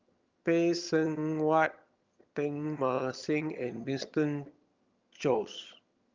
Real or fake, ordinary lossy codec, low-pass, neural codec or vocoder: fake; Opus, 24 kbps; 7.2 kHz; vocoder, 22.05 kHz, 80 mel bands, HiFi-GAN